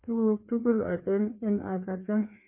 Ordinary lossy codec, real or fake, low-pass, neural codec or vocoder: MP3, 24 kbps; fake; 3.6 kHz; codec, 16 kHz, 2 kbps, FreqCodec, larger model